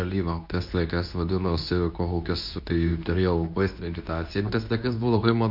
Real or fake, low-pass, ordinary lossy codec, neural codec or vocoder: fake; 5.4 kHz; MP3, 48 kbps; codec, 24 kHz, 0.9 kbps, WavTokenizer, medium speech release version 2